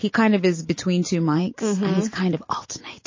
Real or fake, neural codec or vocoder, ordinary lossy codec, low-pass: fake; vocoder, 44.1 kHz, 128 mel bands every 512 samples, BigVGAN v2; MP3, 32 kbps; 7.2 kHz